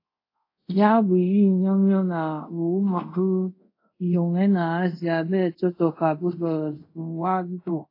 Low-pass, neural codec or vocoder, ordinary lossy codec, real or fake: 5.4 kHz; codec, 24 kHz, 0.5 kbps, DualCodec; AAC, 32 kbps; fake